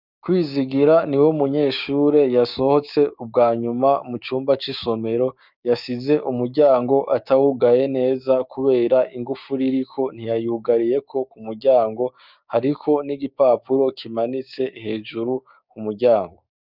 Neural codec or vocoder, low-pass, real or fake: codec, 16 kHz, 6 kbps, DAC; 5.4 kHz; fake